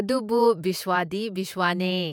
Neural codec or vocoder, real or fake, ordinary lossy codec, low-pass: vocoder, 48 kHz, 128 mel bands, Vocos; fake; none; 19.8 kHz